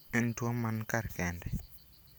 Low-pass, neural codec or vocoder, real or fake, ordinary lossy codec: none; none; real; none